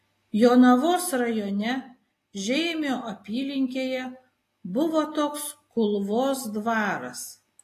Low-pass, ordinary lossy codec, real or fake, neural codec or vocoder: 14.4 kHz; AAC, 48 kbps; real; none